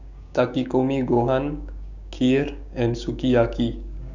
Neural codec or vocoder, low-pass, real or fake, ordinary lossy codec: codec, 16 kHz, 6 kbps, DAC; 7.2 kHz; fake; none